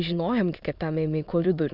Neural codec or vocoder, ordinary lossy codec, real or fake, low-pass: autoencoder, 22.05 kHz, a latent of 192 numbers a frame, VITS, trained on many speakers; Opus, 64 kbps; fake; 5.4 kHz